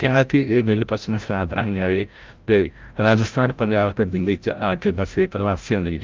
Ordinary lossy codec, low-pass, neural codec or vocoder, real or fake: Opus, 24 kbps; 7.2 kHz; codec, 16 kHz, 0.5 kbps, FreqCodec, larger model; fake